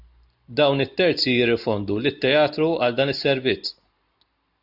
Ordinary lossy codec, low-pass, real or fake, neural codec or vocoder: AAC, 48 kbps; 5.4 kHz; real; none